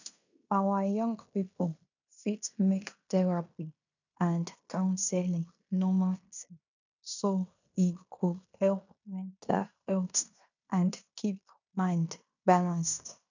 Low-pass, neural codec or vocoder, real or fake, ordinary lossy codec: 7.2 kHz; codec, 16 kHz in and 24 kHz out, 0.9 kbps, LongCat-Audio-Codec, fine tuned four codebook decoder; fake; none